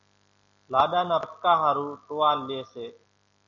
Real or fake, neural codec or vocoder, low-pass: real; none; 7.2 kHz